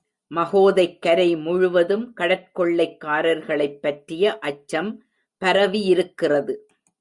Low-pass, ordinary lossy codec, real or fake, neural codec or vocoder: 10.8 kHz; Opus, 64 kbps; real; none